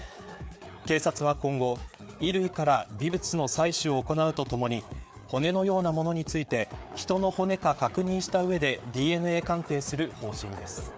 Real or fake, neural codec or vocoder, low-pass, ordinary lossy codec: fake; codec, 16 kHz, 4 kbps, FreqCodec, larger model; none; none